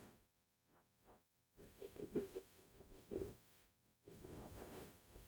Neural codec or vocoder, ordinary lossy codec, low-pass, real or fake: codec, 44.1 kHz, 0.9 kbps, DAC; none; none; fake